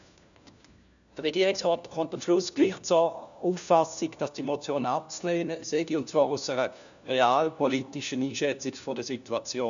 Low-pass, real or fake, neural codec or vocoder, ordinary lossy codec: 7.2 kHz; fake; codec, 16 kHz, 1 kbps, FunCodec, trained on LibriTTS, 50 frames a second; MP3, 96 kbps